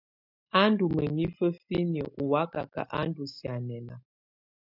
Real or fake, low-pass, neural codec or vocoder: real; 5.4 kHz; none